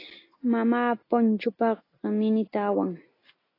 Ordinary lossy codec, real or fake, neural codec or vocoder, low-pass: AAC, 24 kbps; real; none; 5.4 kHz